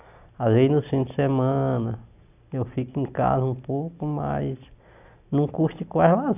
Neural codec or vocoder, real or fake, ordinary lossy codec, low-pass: none; real; none; 3.6 kHz